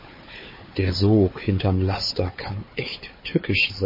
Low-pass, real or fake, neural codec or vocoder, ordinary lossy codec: 5.4 kHz; fake; codec, 16 kHz, 4 kbps, FunCodec, trained on Chinese and English, 50 frames a second; MP3, 24 kbps